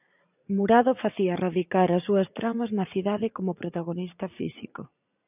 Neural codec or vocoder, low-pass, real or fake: vocoder, 44.1 kHz, 80 mel bands, Vocos; 3.6 kHz; fake